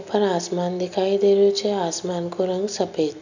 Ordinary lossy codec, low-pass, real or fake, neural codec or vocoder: none; 7.2 kHz; real; none